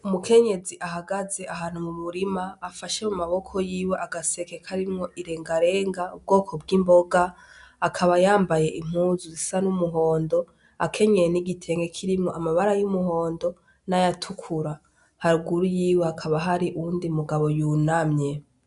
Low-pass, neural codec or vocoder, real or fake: 10.8 kHz; none; real